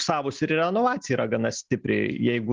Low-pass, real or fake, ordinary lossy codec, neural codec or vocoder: 7.2 kHz; real; Opus, 24 kbps; none